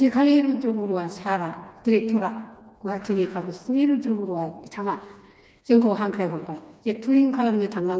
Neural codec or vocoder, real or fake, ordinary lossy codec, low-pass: codec, 16 kHz, 2 kbps, FreqCodec, smaller model; fake; none; none